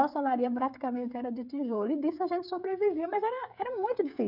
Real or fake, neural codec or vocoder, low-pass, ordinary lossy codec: fake; codec, 16 kHz, 16 kbps, FreqCodec, smaller model; 5.4 kHz; none